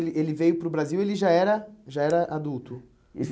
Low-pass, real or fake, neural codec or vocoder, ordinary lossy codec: none; real; none; none